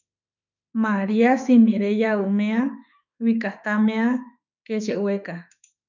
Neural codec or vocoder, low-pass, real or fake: autoencoder, 48 kHz, 32 numbers a frame, DAC-VAE, trained on Japanese speech; 7.2 kHz; fake